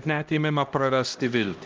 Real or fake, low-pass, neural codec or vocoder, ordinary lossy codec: fake; 7.2 kHz; codec, 16 kHz, 1 kbps, X-Codec, HuBERT features, trained on LibriSpeech; Opus, 24 kbps